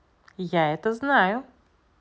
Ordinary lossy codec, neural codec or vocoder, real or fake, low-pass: none; none; real; none